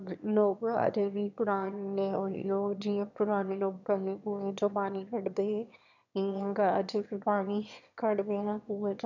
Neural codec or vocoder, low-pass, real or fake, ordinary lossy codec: autoencoder, 22.05 kHz, a latent of 192 numbers a frame, VITS, trained on one speaker; 7.2 kHz; fake; none